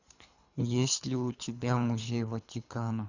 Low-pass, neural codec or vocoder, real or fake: 7.2 kHz; codec, 24 kHz, 3 kbps, HILCodec; fake